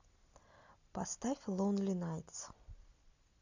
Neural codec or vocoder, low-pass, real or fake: none; 7.2 kHz; real